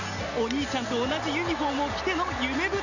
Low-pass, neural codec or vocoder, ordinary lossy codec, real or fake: 7.2 kHz; none; none; real